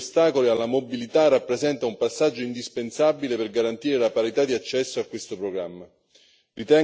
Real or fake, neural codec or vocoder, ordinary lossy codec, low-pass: real; none; none; none